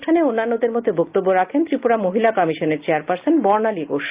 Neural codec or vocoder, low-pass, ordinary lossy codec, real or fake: none; 3.6 kHz; Opus, 24 kbps; real